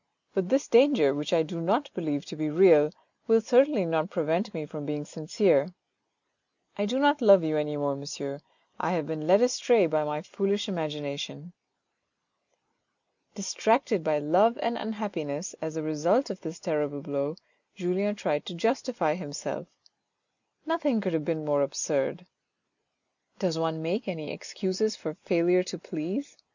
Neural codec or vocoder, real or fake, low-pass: none; real; 7.2 kHz